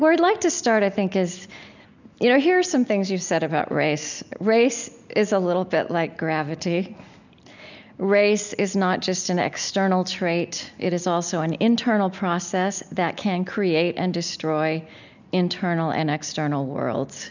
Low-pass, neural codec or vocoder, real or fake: 7.2 kHz; none; real